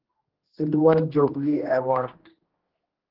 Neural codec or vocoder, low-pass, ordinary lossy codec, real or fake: codec, 16 kHz, 1 kbps, X-Codec, HuBERT features, trained on general audio; 5.4 kHz; Opus, 16 kbps; fake